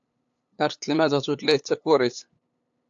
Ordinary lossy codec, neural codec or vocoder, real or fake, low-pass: AAC, 64 kbps; codec, 16 kHz, 8 kbps, FunCodec, trained on LibriTTS, 25 frames a second; fake; 7.2 kHz